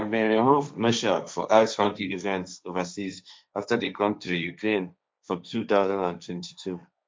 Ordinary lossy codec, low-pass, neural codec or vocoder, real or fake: none; none; codec, 16 kHz, 1.1 kbps, Voila-Tokenizer; fake